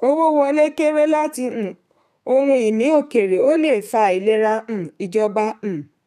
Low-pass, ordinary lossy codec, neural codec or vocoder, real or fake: 14.4 kHz; none; codec, 32 kHz, 1.9 kbps, SNAC; fake